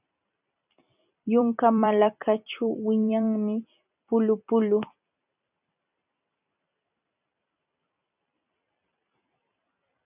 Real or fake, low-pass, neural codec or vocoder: fake; 3.6 kHz; vocoder, 44.1 kHz, 128 mel bands every 256 samples, BigVGAN v2